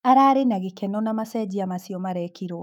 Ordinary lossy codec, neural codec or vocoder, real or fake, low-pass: none; autoencoder, 48 kHz, 128 numbers a frame, DAC-VAE, trained on Japanese speech; fake; 19.8 kHz